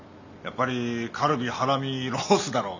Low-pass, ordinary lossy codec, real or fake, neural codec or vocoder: 7.2 kHz; none; real; none